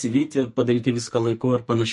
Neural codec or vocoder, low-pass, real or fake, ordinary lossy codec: codec, 44.1 kHz, 2.6 kbps, SNAC; 14.4 kHz; fake; MP3, 48 kbps